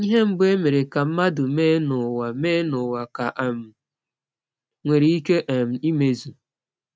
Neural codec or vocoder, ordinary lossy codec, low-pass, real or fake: none; none; none; real